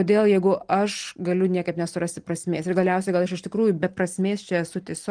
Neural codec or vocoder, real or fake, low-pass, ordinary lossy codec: none; real; 9.9 kHz; Opus, 24 kbps